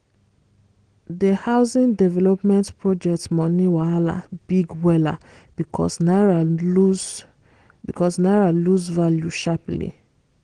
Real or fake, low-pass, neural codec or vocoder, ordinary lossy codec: real; 9.9 kHz; none; Opus, 16 kbps